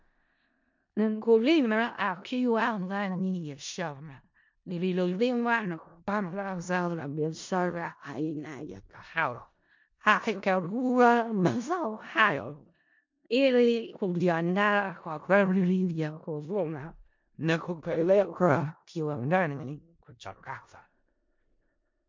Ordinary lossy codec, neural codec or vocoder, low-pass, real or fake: MP3, 48 kbps; codec, 16 kHz in and 24 kHz out, 0.4 kbps, LongCat-Audio-Codec, four codebook decoder; 7.2 kHz; fake